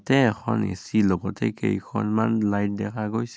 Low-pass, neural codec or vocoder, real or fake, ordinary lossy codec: none; none; real; none